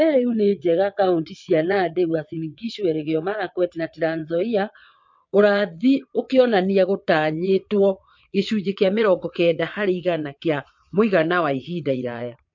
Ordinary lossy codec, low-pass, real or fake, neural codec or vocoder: MP3, 48 kbps; 7.2 kHz; fake; vocoder, 22.05 kHz, 80 mel bands, WaveNeXt